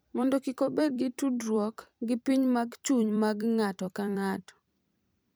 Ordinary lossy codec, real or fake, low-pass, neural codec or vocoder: none; fake; none; vocoder, 44.1 kHz, 128 mel bands every 256 samples, BigVGAN v2